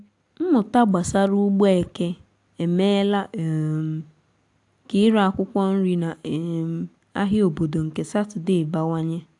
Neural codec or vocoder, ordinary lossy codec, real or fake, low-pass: none; AAC, 64 kbps; real; 10.8 kHz